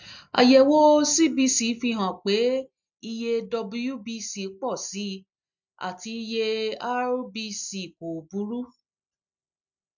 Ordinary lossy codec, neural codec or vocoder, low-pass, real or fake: none; none; 7.2 kHz; real